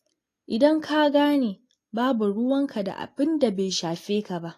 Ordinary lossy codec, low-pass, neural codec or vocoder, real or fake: AAC, 48 kbps; 14.4 kHz; none; real